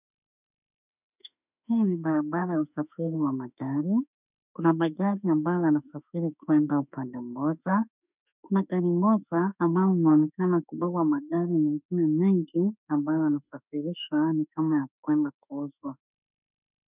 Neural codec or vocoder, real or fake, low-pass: autoencoder, 48 kHz, 32 numbers a frame, DAC-VAE, trained on Japanese speech; fake; 3.6 kHz